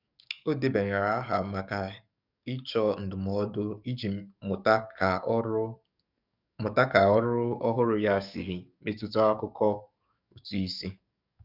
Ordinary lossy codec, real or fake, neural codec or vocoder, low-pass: none; fake; codec, 44.1 kHz, 7.8 kbps, DAC; 5.4 kHz